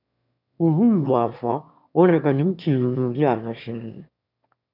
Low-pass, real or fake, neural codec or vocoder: 5.4 kHz; fake; autoencoder, 22.05 kHz, a latent of 192 numbers a frame, VITS, trained on one speaker